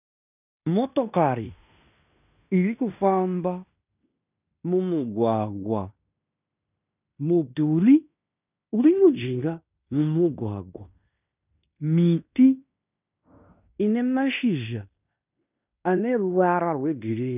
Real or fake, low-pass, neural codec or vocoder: fake; 3.6 kHz; codec, 16 kHz in and 24 kHz out, 0.9 kbps, LongCat-Audio-Codec, fine tuned four codebook decoder